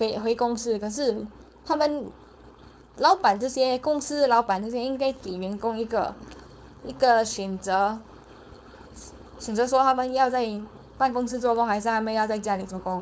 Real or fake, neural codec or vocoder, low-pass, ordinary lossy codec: fake; codec, 16 kHz, 4.8 kbps, FACodec; none; none